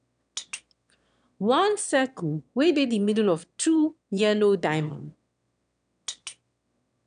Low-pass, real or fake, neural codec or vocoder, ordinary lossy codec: 9.9 kHz; fake; autoencoder, 22.05 kHz, a latent of 192 numbers a frame, VITS, trained on one speaker; none